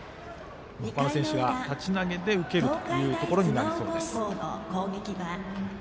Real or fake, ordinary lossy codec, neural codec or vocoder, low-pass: real; none; none; none